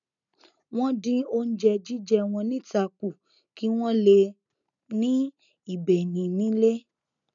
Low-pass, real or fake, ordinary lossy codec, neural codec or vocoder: 7.2 kHz; real; none; none